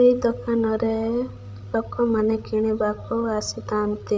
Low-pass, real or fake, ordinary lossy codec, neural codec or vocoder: none; fake; none; codec, 16 kHz, 16 kbps, FreqCodec, larger model